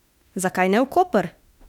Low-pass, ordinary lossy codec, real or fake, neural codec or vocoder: 19.8 kHz; none; fake; autoencoder, 48 kHz, 32 numbers a frame, DAC-VAE, trained on Japanese speech